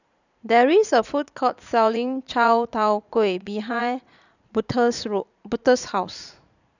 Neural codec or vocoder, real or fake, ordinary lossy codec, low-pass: vocoder, 44.1 kHz, 80 mel bands, Vocos; fake; none; 7.2 kHz